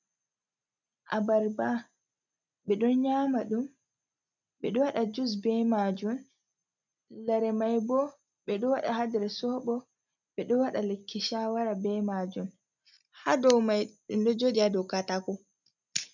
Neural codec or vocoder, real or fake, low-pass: none; real; 7.2 kHz